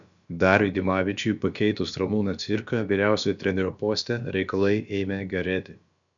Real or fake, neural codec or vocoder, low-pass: fake; codec, 16 kHz, about 1 kbps, DyCAST, with the encoder's durations; 7.2 kHz